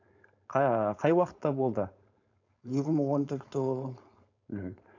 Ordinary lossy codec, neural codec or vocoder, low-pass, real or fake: AAC, 48 kbps; codec, 16 kHz, 4.8 kbps, FACodec; 7.2 kHz; fake